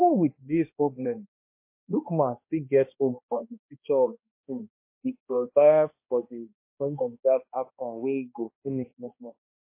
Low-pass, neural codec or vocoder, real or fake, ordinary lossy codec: 3.6 kHz; codec, 16 kHz, 1 kbps, X-Codec, HuBERT features, trained on balanced general audio; fake; MP3, 24 kbps